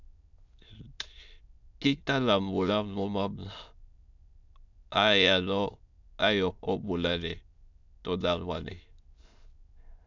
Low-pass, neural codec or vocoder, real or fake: 7.2 kHz; autoencoder, 22.05 kHz, a latent of 192 numbers a frame, VITS, trained on many speakers; fake